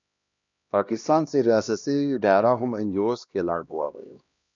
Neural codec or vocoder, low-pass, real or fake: codec, 16 kHz, 1 kbps, X-Codec, HuBERT features, trained on LibriSpeech; 7.2 kHz; fake